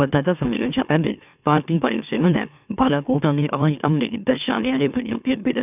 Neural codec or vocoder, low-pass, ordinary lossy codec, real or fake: autoencoder, 44.1 kHz, a latent of 192 numbers a frame, MeloTTS; 3.6 kHz; none; fake